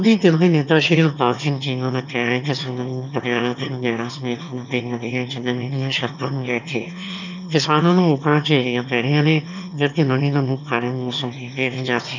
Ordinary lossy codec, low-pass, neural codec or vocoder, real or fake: none; 7.2 kHz; autoencoder, 22.05 kHz, a latent of 192 numbers a frame, VITS, trained on one speaker; fake